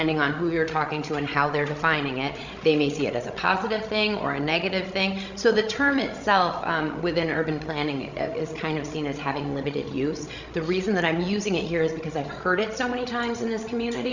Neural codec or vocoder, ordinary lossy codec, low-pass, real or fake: codec, 16 kHz, 16 kbps, FreqCodec, larger model; Opus, 64 kbps; 7.2 kHz; fake